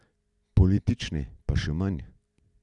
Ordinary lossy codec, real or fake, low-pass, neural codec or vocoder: none; real; 10.8 kHz; none